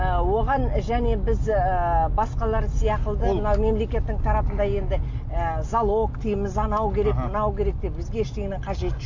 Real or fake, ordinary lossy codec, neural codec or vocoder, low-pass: real; AAC, 48 kbps; none; 7.2 kHz